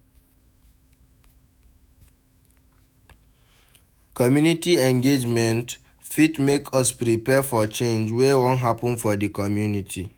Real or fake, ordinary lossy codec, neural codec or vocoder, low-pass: fake; none; autoencoder, 48 kHz, 128 numbers a frame, DAC-VAE, trained on Japanese speech; none